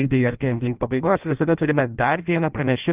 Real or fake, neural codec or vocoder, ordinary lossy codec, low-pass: fake; codec, 16 kHz in and 24 kHz out, 0.6 kbps, FireRedTTS-2 codec; Opus, 32 kbps; 3.6 kHz